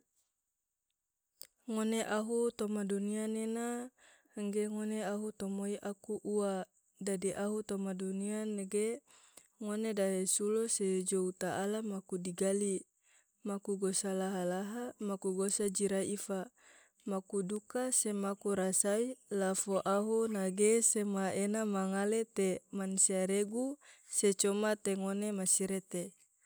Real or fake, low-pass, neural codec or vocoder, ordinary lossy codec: real; none; none; none